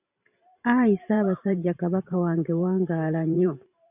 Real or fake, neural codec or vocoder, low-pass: fake; vocoder, 44.1 kHz, 128 mel bands every 512 samples, BigVGAN v2; 3.6 kHz